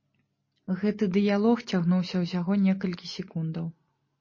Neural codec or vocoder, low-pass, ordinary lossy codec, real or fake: none; 7.2 kHz; MP3, 32 kbps; real